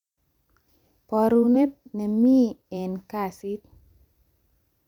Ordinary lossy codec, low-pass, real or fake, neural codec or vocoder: none; 19.8 kHz; fake; vocoder, 44.1 kHz, 128 mel bands every 512 samples, BigVGAN v2